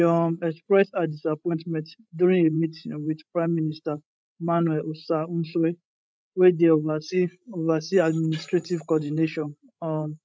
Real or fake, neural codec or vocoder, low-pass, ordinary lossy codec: fake; codec, 16 kHz, 16 kbps, FreqCodec, larger model; none; none